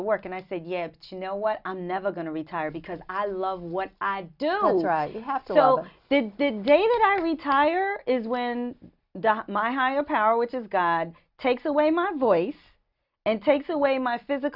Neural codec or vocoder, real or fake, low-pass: none; real; 5.4 kHz